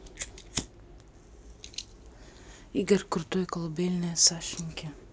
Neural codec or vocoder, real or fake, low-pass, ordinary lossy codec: none; real; none; none